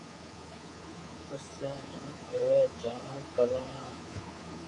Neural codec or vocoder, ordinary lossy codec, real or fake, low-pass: codec, 24 kHz, 3.1 kbps, DualCodec; AAC, 32 kbps; fake; 10.8 kHz